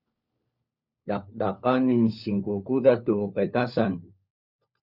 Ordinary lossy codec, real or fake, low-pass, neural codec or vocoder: Opus, 64 kbps; fake; 5.4 kHz; codec, 16 kHz, 4 kbps, FunCodec, trained on LibriTTS, 50 frames a second